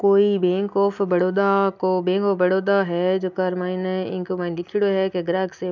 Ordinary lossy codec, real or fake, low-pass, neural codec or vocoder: none; real; 7.2 kHz; none